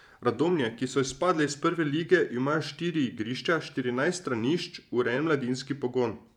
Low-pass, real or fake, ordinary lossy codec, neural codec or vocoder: 19.8 kHz; fake; none; vocoder, 44.1 kHz, 128 mel bands every 512 samples, BigVGAN v2